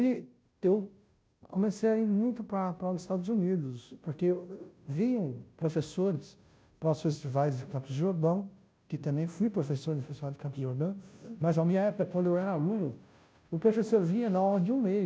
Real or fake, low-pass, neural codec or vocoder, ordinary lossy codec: fake; none; codec, 16 kHz, 0.5 kbps, FunCodec, trained on Chinese and English, 25 frames a second; none